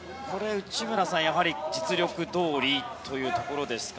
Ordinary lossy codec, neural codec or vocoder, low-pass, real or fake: none; none; none; real